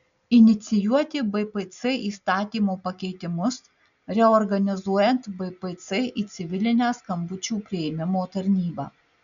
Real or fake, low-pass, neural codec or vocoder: real; 7.2 kHz; none